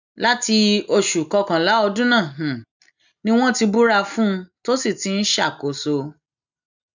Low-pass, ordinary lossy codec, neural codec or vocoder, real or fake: 7.2 kHz; none; none; real